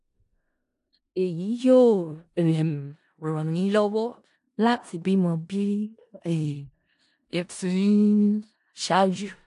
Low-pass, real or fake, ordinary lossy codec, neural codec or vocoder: 10.8 kHz; fake; AAC, 64 kbps; codec, 16 kHz in and 24 kHz out, 0.4 kbps, LongCat-Audio-Codec, four codebook decoder